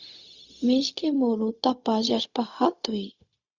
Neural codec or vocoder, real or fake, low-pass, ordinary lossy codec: codec, 16 kHz, 0.4 kbps, LongCat-Audio-Codec; fake; 7.2 kHz; Opus, 64 kbps